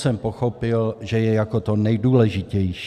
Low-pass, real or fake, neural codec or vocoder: 14.4 kHz; real; none